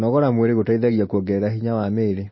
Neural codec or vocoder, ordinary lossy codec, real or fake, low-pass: none; MP3, 24 kbps; real; 7.2 kHz